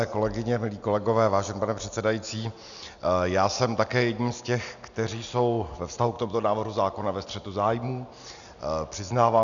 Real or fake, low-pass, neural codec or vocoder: real; 7.2 kHz; none